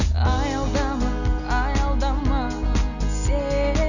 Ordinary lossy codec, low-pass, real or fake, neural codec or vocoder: none; 7.2 kHz; real; none